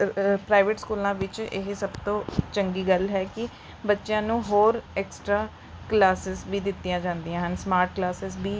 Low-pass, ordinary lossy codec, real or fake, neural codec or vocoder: none; none; real; none